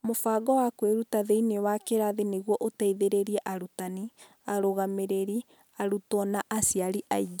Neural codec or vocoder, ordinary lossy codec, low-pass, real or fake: none; none; none; real